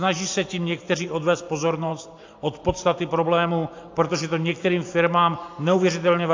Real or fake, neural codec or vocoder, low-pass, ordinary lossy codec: fake; vocoder, 44.1 kHz, 128 mel bands every 512 samples, BigVGAN v2; 7.2 kHz; AAC, 48 kbps